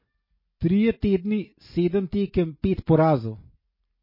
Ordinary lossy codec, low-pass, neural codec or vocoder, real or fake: MP3, 24 kbps; 5.4 kHz; none; real